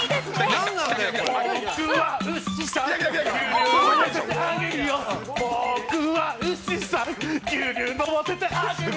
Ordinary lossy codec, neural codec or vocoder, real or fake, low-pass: none; none; real; none